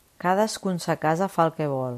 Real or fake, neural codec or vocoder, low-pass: real; none; 14.4 kHz